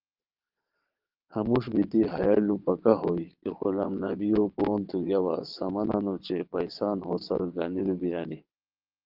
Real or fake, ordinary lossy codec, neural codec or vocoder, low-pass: fake; Opus, 32 kbps; vocoder, 22.05 kHz, 80 mel bands, WaveNeXt; 5.4 kHz